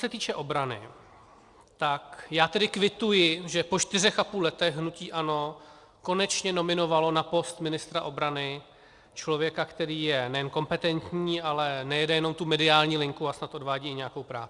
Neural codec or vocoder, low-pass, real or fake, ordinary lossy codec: none; 10.8 kHz; real; Opus, 64 kbps